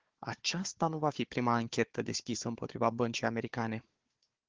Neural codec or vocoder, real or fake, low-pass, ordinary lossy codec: codec, 16 kHz, 4 kbps, FunCodec, trained on Chinese and English, 50 frames a second; fake; 7.2 kHz; Opus, 16 kbps